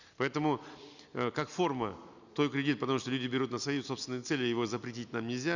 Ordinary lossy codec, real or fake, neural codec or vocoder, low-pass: none; real; none; 7.2 kHz